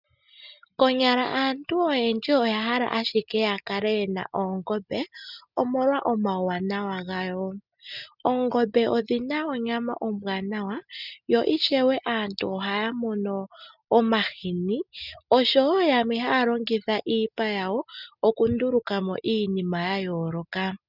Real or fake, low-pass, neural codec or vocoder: real; 5.4 kHz; none